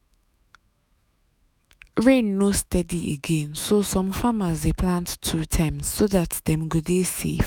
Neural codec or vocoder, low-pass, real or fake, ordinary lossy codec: autoencoder, 48 kHz, 128 numbers a frame, DAC-VAE, trained on Japanese speech; none; fake; none